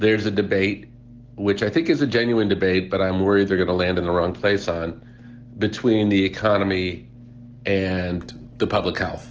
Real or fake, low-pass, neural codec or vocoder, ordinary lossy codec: real; 7.2 kHz; none; Opus, 16 kbps